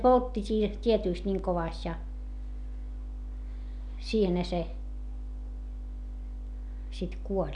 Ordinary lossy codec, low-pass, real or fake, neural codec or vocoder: none; 10.8 kHz; real; none